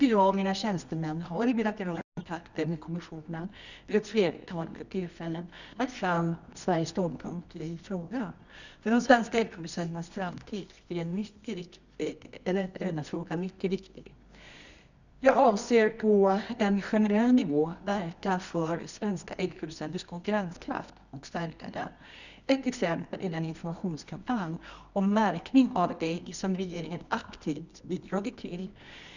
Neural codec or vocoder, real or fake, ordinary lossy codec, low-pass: codec, 24 kHz, 0.9 kbps, WavTokenizer, medium music audio release; fake; none; 7.2 kHz